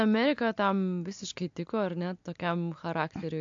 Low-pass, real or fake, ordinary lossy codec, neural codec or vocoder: 7.2 kHz; real; AAC, 64 kbps; none